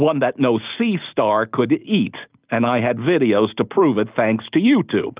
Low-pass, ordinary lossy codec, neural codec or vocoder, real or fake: 3.6 kHz; Opus, 24 kbps; none; real